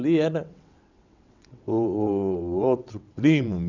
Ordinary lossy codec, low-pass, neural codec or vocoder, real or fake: none; 7.2 kHz; vocoder, 22.05 kHz, 80 mel bands, WaveNeXt; fake